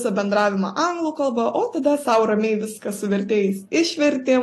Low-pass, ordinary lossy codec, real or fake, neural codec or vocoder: 14.4 kHz; AAC, 48 kbps; real; none